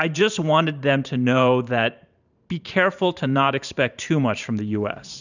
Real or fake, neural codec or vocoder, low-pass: fake; vocoder, 44.1 kHz, 128 mel bands every 512 samples, BigVGAN v2; 7.2 kHz